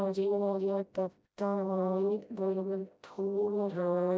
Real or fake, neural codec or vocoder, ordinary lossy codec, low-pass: fake; codec, 16 kHz, 0.5 kbps, FreqCodec, smaller model; none; none